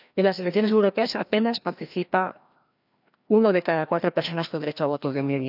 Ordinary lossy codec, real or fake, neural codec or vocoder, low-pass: none; fake; codec, 16 kHz, 1 kbps, FreqCodec, larger model; 5.4 kHz